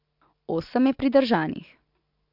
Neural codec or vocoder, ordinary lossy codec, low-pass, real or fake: none; MP3, 48 kbps; 5.4 kHz; real